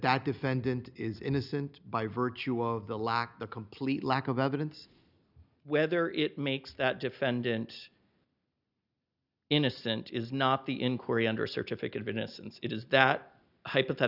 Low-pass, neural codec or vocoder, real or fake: 5.4 kHz; none; real